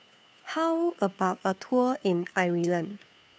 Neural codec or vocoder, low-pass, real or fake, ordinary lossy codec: codec, 16 kHz, 2 kbps, FunCodec, trained on Chinese and English, 25 frames a second; none; fake; none